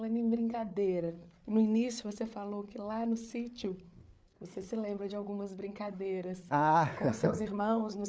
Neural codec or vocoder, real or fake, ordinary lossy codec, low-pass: codec, 16 kHz, 8 kbps, FreqCodec, larger model; fake; none; none